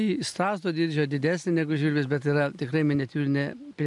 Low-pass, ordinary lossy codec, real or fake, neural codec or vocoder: 10.8 kHz; MP3, 96 kbps; real; none